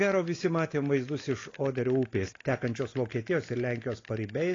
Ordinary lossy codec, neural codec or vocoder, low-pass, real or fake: AAC, 32 kbps; none; 7.2 kHz; real